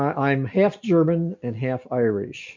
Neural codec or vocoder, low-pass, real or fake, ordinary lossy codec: none; 7.2 kHz; real; MP3, 48 kbps